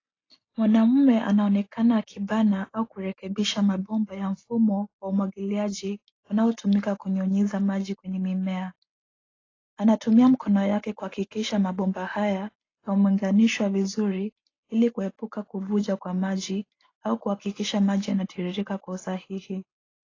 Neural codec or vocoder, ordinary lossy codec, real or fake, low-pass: none; AAC, 32 kbps; real; 7.2 kHz